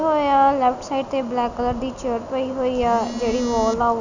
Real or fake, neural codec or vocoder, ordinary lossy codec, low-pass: real; none; none; 7.2 kHz